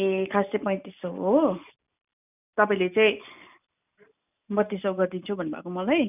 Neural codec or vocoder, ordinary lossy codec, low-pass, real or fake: none; none; 3.6 kHz; real